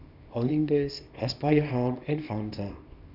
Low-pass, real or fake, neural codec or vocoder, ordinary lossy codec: 5.4 kHz; fake; codec, 24 kHz, 0.9 kbps, WavTokenizer, small release; none